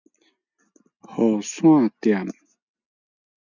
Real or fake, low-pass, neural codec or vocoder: real; 7.2 kHz; none